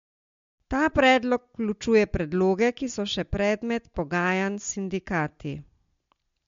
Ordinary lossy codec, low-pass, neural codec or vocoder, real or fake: MP3, 48 kbps; 7.2 kHz; none; real